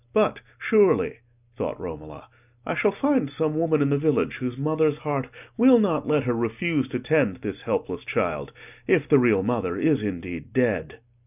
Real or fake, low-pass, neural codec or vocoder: real; 3.6 kHz; none